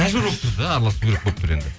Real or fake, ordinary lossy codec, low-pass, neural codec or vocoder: real; none; none; none